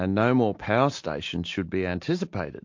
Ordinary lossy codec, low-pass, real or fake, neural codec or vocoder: MP3, 48 kbps; 7.2 kHz; real; none